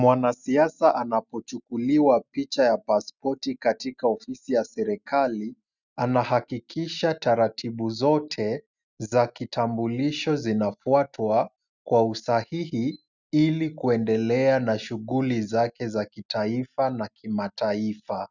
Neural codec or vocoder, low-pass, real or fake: none; 7.2 kHz; real